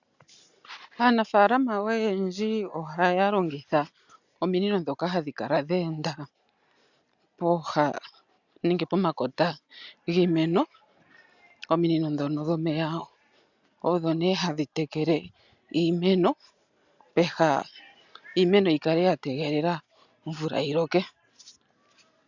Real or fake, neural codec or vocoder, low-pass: real; none; 7.2 kHz